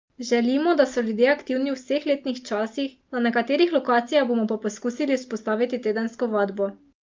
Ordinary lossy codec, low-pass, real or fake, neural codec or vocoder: Opus, 24 kbps; 7.2 kHz; real; none